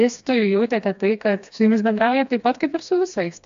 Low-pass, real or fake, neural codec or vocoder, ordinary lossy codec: 7.2 kHz; fake; codec, 16 kHz, 2 kbps, FreqCodec, smaller model; AAC, 64 kbps